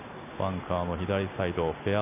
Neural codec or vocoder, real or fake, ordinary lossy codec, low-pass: none; real; none; 3.6 kHz